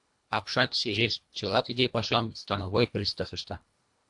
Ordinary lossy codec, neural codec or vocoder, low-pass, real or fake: AAC, 64 kbps; codec, 24 kHz, 1.5 kbps, HILCodec; 10.8 kHz; fake